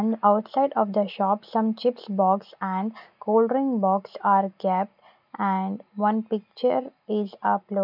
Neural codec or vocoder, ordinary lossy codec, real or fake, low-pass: none; none; real; 5.4 kHz